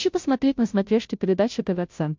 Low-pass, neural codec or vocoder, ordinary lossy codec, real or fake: 7.2 kHz; codec, 16 kHz, 0.5 kbps, FunCodec, trained on Chinese and English, 25 frames a second; MP3, 64 kbps; fake